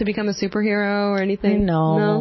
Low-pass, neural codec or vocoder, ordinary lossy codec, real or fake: 7.2 kHz; none; MP3, 24 kbps; real